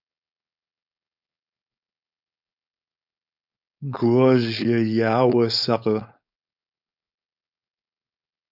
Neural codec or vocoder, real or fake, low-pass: codec, 16 kHz, 4.8 kbps, FACodec; fake; 5.4 kHz